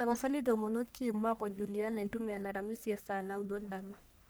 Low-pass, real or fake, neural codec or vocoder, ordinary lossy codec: none; fake; codec, 44.1 kHz, 1.7 kbps, Pupu-Codec; none